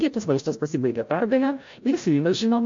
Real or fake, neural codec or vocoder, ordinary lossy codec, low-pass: fake; codec, 16 kHz, 0.5 kbps, FreqCodec, larger model; MP3, 48 kbps; 7.2 kHz